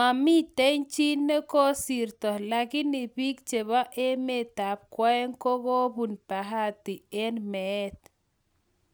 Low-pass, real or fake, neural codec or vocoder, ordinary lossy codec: none; real; none; none